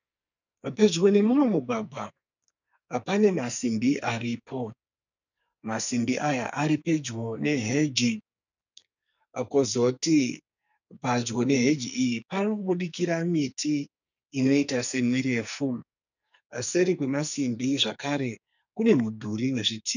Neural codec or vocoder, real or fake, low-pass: codec, 44.1 kHz, 2.6 kbps, SNAC; fake; 7.2 kHz